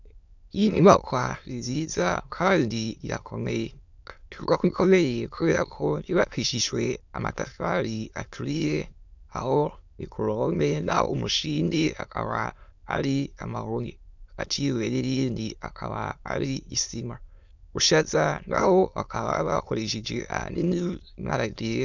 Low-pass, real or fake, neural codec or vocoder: 7.2 kHz; fake; autoencoder, 22.05 kHz, a latent of 192 numbers a frame, VITS, trained on many speakers